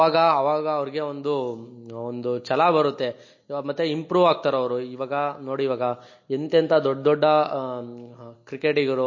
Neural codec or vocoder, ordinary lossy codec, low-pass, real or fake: none; MP3, 32 kbps; 7.2 kHz; real